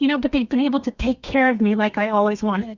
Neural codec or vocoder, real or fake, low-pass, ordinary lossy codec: codec, 32 kHz, 1.9 kbps, SNAC; fake; 7.2 kHz; Opus, 64 kbps